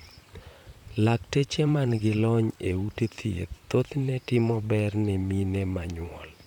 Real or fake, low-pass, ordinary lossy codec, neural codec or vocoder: fake; 19.8 kHz; none; vocoder, 44.1 kHz, 128 mel bands, Pupu-Vocoder